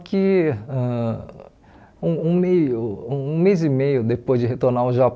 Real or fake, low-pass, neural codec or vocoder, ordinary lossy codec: real; none; none; none